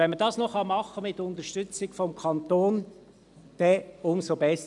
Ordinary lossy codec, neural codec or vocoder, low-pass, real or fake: none; none; 10.8 kHz; real